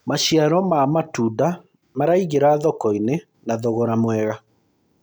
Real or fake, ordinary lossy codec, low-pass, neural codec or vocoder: real; none; none; none